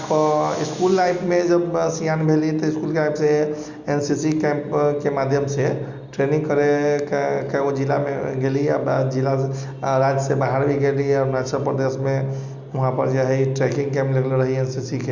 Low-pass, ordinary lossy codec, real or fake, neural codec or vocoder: 7.2 kHz; Opus, 64 kbps; real; none